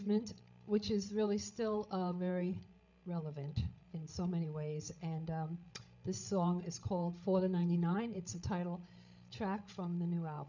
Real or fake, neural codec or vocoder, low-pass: fake; codec, 16 kHz, 16 kbps, FreqCodec, larger model; 7.2 kHz